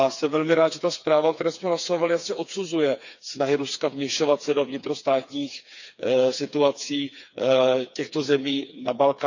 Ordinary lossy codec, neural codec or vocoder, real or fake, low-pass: none; codec, 16 kHz, 4 kbps, FreqCodec, smaller model; fake; 7.2 kHz